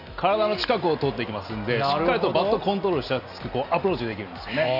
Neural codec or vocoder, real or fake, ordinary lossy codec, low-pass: none; real; none; 5.4 kHz